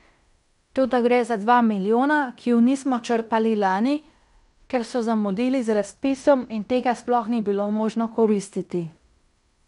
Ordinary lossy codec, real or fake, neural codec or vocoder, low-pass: none; fake; codec, 16 kHz in and 24 kHz out, 0.9 kbps, LongCat-Audio-Codec, fine tuned four codebook decoder; 10.8 kHz